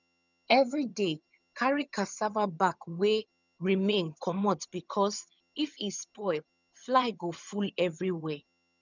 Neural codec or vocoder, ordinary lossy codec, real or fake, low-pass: vocoder, 22.05 kHz, 80 mel bands, HiFi-GAN; none; fake; 7.2 kHz